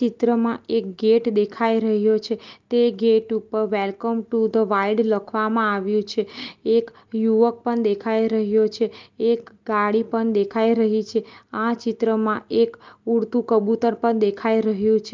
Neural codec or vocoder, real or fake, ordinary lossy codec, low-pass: none; real; Opus, 32 kbps; 7.2 kHz